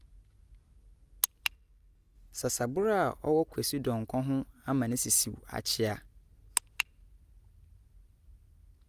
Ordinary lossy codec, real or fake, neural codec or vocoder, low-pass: Opus, 32 kbps; real; none; 14.4 kHz